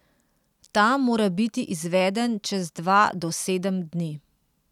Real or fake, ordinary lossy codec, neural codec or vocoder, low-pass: real; none; none; 19.8 kHz